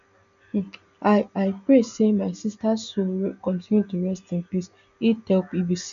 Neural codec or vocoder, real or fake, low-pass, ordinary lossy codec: none; real; 7.2 kHz; none